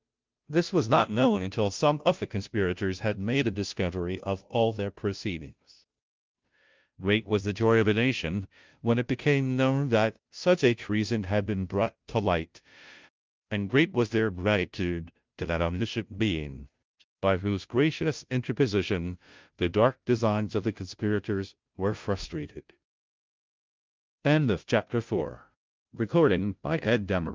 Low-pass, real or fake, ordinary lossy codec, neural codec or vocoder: 7.2 kHz; fake; Opus, 24 kbps; codec, 16 kHz, 0.5 kbps, FunCodec, trained on Chinese and English, 25 frames a second